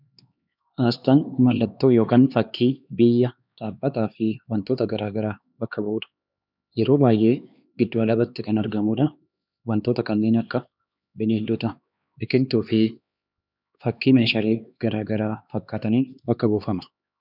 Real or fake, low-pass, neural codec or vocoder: fake; 5.4 kHz; codec, 16 kHz, 2 kbps, X-Codec, HuBERT features, trained on LibriSpeech